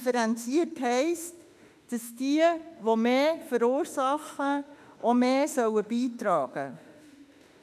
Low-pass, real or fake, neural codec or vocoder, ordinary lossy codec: 14.4 kHz; fake; autoencoder, 48 kHz, 32 numbers a frame, DAC-VAE, trained on Japanese speech; none